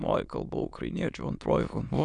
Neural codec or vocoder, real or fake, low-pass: autoencoder, 22.05 kHz, a latent of 192 numbers a frame, VITS, trained on many speakers; fake; 9.9 kHz